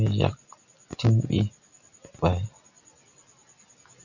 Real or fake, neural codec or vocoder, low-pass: real; none; 7.2 kHz